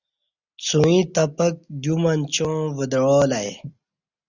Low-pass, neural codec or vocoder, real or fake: 7.2 kHz; none; real